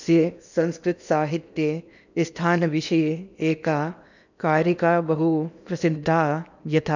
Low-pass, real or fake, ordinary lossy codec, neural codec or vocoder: 7.2 kHz; fake; none; codec, 16 kHz in and 24 kHz out, 0.8 kbps, FocalCodec, streaming, 65536 codes